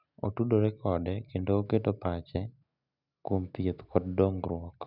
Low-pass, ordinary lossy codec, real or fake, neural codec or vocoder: 5.4 kHz; none; real; none